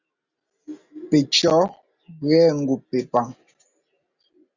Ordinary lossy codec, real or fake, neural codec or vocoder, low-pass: Opus, 64 kbps; real; none; 7.2 kHz